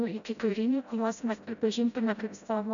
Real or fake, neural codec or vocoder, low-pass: fake; codec, 16 kHz, 0.5 kbps, FreqCodec, smaller model; 7.2 kHz